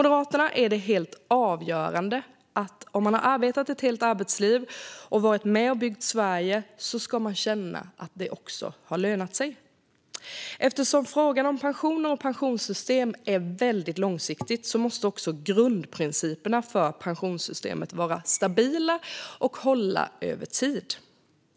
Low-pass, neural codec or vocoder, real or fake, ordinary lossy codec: none; none; real; none